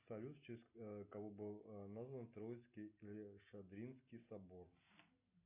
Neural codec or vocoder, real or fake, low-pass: none; real; 3.6 kHz